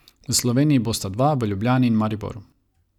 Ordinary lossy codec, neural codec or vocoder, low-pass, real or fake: none; none; 19.8 kHz; real